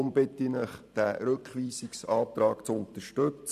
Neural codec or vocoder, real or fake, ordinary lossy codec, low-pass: vocoder, 44.1 kHz, 128 mel bands every 512 samples, BigVGAN v2; fake; none; 14.4 kHz